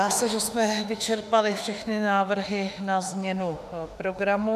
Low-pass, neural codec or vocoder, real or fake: 14.4 kHz; autoencoder, 48 kHz, 32 numbers a frame, DAC-VAE, trained on Japanese speech; fake